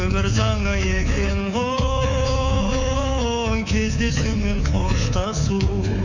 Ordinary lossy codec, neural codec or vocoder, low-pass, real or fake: none; codec, 24 kHz, 3.1 kbps, DualCodec; 7.2 kHz; fake